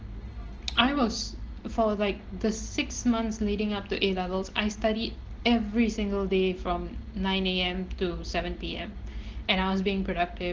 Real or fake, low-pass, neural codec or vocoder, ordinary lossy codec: real; 7.2 kHz; none; Opus, 16 kbps